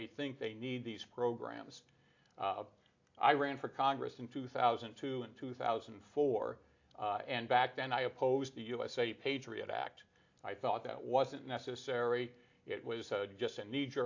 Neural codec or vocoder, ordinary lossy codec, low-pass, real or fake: none; AAC, 48 kbps; 7.2 kHz; real